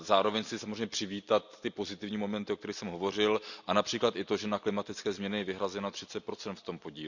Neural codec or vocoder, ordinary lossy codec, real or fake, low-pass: none; none; real; 7.2 kHz